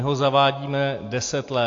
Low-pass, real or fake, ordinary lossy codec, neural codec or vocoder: 7.2 kHz; real; AAC, 48 kbps; none